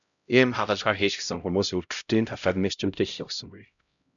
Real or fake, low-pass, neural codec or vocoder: fake; 7.2 kHz; codec, 16 kHz, 0.5 kbps, X-Codec, HuBERT features, trained on LibriSpeech